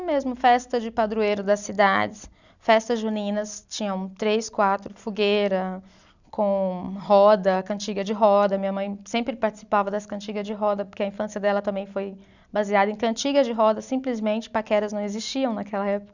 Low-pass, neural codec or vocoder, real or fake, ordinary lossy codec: 7.2 kHz; none; real; none